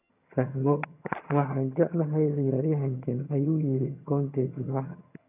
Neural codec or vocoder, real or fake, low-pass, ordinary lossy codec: vocoder, 22.05 kHz, 80 mel bands, HiFi-GAN; fake; 3.6 kHz; none